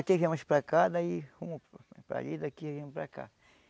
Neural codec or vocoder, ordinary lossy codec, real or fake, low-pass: none; none; real; none